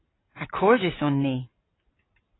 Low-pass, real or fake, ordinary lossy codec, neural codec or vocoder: 7.2 kHz; real; AAC, 16 kbps; none